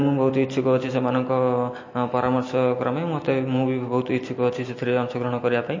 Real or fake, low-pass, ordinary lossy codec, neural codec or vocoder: real; 7.2 kHz; MP3, 32 kbps; none